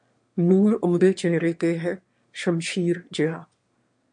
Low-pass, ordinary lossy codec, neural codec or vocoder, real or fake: 9.9 kHz; MP3, 48 kbps; autoencoder, 22.05 kHz, a latent of 192 numbers a frame, VITS, trained on one speaker; fake